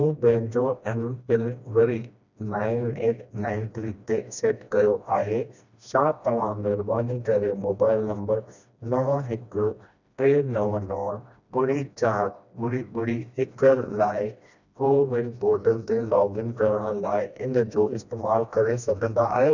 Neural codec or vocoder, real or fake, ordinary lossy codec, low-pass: codec, 16 kHz, 1 kbps, FreqCodec, smaller model; fake; none; 7.2 kHz